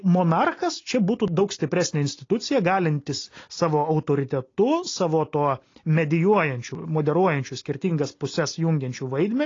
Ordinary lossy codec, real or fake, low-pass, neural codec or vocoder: AAC, 32 kbps; real; 7.2 kHz; none